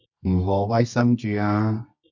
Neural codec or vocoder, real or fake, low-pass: codec, 24 kHz, 0.9 kbps, WavTokenizer, medium music audio release; fake; 7.2 kHz